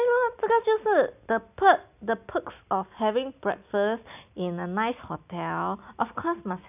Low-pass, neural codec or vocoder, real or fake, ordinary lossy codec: 3.6 kHz; none; real; none